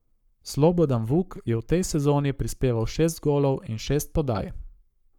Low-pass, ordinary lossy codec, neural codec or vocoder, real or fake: 19.8 kHz; none; codec, 44.1 kHz, 7.8 kbps, Pupu-Codec; fake